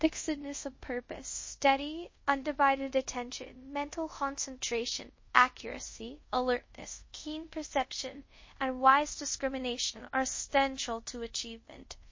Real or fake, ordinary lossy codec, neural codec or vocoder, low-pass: fake; MP3, 32 kbps; codec, 24 kHz, 0.5 kbps, DualCodec; 7.2 kHz